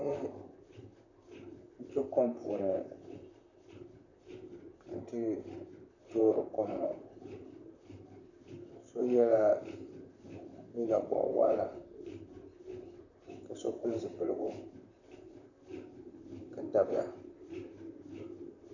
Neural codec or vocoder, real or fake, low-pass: vocoder, 44.1 kHz, 128 mel bands, Pupu-Vocoder; fake; 7.2 kHz